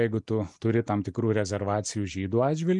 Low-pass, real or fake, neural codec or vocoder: 10.8 kHz; real; none